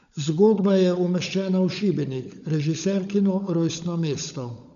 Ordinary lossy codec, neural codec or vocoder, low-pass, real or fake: none; codec, 16 kHz, 16 kbps, FunCodec, trained on LibriTTS, 50 frames a second; 7.2 kHz; fake